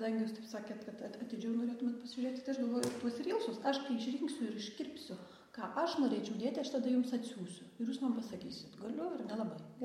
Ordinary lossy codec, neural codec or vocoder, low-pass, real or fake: MP3, 64 kbps; none; 19.8 kHz; real